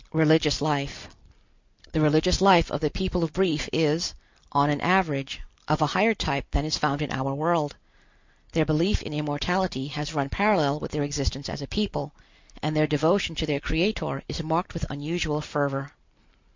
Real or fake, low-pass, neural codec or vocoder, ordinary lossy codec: real; 7.2 kHz; none; MP3, 64 kbps